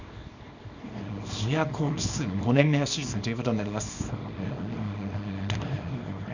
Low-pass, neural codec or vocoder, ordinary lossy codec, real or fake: 7.2 kHz; codec, 24 kHz, 0.9 kbps, WavTokenizer, small release; none; fake